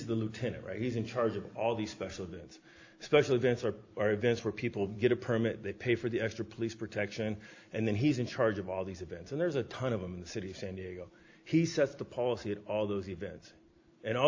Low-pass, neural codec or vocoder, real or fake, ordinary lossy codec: 7.2 kHz; none; real; AAC, 48 kbps